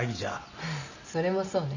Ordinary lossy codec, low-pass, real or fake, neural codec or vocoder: none; 7.2 kHz; real; none